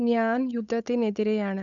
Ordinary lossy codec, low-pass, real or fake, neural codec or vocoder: none; 7.2 kHz; fake; codec, 16 kHz, 8 kbps, FunCodec, trained on Chinese and English, 25 frames a second